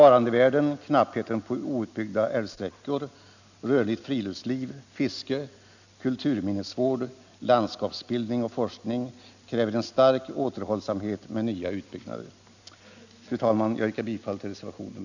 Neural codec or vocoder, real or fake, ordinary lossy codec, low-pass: none; real; none; 7.2 kHz